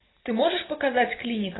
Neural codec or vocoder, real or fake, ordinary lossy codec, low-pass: none; real; AAC, 16 kbps; 7.2 kHz